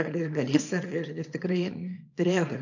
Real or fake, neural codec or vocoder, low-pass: fake; codec, 24 kHz, 0.9 kbps, WavTokenizer, small release; 7.2 kHz